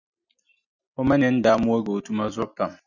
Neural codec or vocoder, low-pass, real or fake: none; 7.2 kHz; real